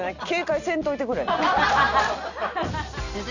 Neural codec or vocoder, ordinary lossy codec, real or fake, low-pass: none; none; real; 7.2 kHz